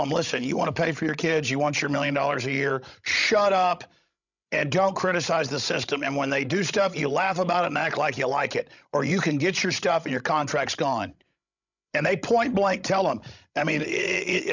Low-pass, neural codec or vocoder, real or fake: 7.2 kHz; codec, 16 kHz, 16 kbps, FreqCodec, larger model; fake